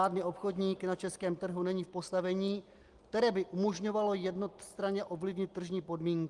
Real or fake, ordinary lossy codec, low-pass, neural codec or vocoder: real; Opus, 24 kbps; 10.8 kHz; none